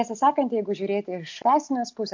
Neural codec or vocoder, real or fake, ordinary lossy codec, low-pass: none; real; MP3, 48 kbps; 7.2 kHz